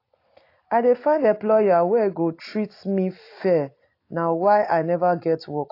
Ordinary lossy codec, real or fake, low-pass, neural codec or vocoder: AAC, 32 kbps; real; 5.4 kHz; none